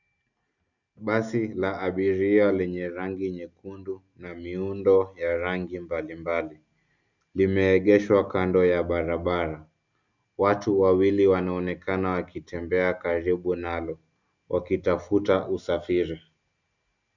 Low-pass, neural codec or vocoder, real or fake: 7.2 kHz; none; real